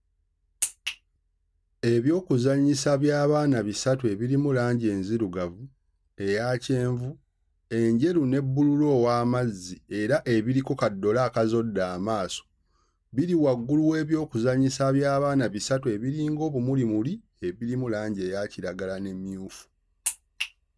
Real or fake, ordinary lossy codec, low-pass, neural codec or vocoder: real; none; none; none